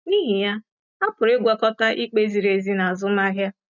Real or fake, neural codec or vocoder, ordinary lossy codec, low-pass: real; none; none; 7.2 kHz